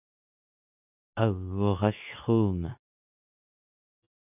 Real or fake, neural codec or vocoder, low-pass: fake; codec, 24 kHz, 0.9 kbps, WavTokenizer, medium speech release version 2; 3.6 kHz